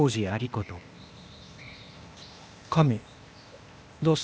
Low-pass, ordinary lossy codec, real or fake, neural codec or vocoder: none; none; fake; codec, 16 kHz, 0.8 kbps, ZipCodec